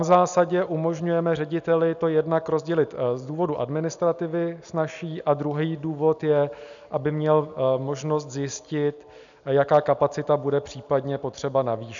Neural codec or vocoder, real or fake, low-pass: none; real; 7.2 kHz